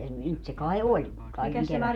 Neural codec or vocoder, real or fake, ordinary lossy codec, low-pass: vocoder, 48 kHz, 128 mel bands, Vocos; fake; none; 19.8 kHz